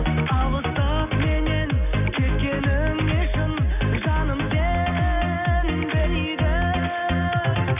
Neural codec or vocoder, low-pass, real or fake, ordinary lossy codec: none; 3.6 kHz; real; none